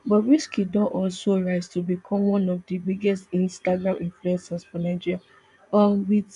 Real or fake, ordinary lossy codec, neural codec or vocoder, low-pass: fake; none; vocoder, 24 kHz, 100 mel bands, Vocos; 10.8 kHz